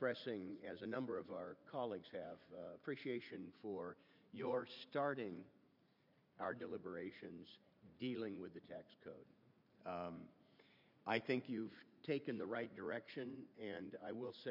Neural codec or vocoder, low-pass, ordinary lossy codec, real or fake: vocoder, 44.1 kHz, 80 mel bands, Vocos; 5.4 kHz; MP3, 48 kbps; fake